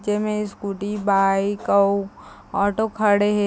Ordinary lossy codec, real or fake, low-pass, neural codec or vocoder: none; real; none; none